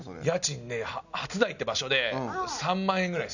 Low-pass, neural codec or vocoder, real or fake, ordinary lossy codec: 7.2 kHz; none; real; none